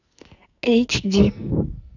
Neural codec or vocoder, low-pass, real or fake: codec, 44.1 kHz, 2.6 kbps, SNAC; 7.2 kHz; fake